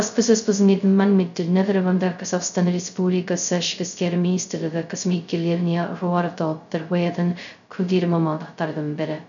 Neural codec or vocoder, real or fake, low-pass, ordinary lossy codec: codec, 16 kHz, 0.2 kbps, FocalCodec; fake; 7.2 kHz; none